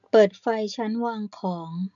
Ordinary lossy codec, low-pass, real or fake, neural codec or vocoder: none; 7.2 kHz; real; none